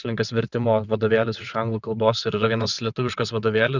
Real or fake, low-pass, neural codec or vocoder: fake; 7.2 kHz; vocoder, 22.05 kHz, 80 mel bands, WaveNeXt